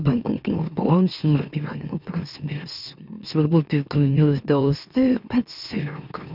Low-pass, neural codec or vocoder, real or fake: 5.4 kHz; autoencoder, 44.1 kHz, a latent of 192 numbers a frame, MeloTTS; fake